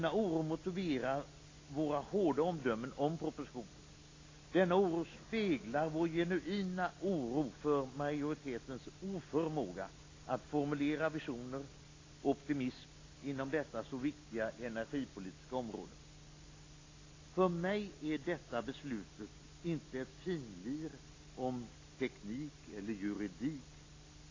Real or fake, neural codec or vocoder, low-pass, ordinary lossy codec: real; none; 7.2 kHz; AAC, 32 kbps